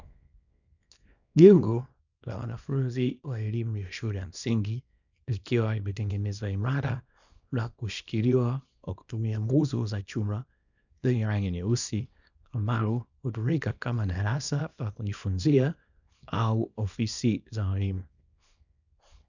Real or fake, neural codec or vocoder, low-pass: fake; codec, 24 kHz, 0.9 kbps, WavTokenizer, small release; 7.2 kHz